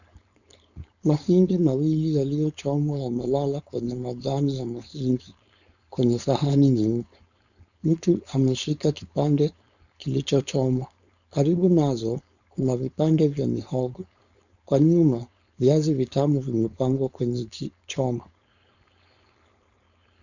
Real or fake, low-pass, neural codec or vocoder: fake; 7.2 kHz; codec, 16 kHz, 4.8 kbps, FACodec